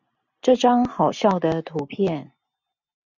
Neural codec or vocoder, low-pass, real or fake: none; 7.2 kHz; real